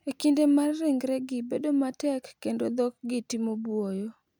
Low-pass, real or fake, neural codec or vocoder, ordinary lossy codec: 19.8 kHz; real; none; none